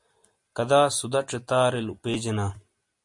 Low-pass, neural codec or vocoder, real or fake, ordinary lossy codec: 10.8 kHz; none; real; MP3, 48 kbps